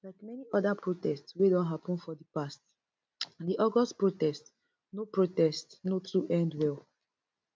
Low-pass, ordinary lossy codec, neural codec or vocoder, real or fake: none; none; none; real